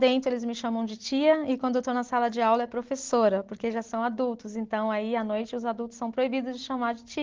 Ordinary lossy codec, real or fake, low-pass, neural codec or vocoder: Opus, 16 kbps; real; 7.2 kHz; none